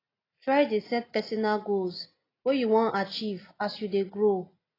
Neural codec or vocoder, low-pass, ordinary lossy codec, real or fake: none; 5.4 kHz; AAC, 24 kbps; real